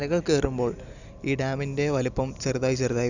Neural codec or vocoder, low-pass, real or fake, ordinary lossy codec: none; 7.2 kHz; real; none